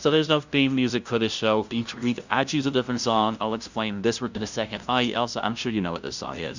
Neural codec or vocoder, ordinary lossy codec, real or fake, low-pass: codec, 16 kHz, 0.5 kbps, FunCodec, trained on LibriTTS, 25 frames a second; Opus, 64 kbps; fake; 7.2 kHz